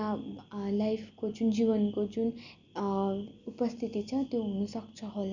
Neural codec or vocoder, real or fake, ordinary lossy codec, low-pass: none; real; none; 7.2 kHz